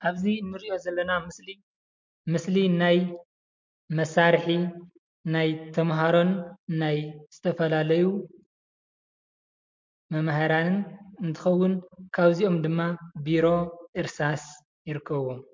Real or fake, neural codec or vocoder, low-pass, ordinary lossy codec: real; none; 7.2 kHz; MP3, 48 kbps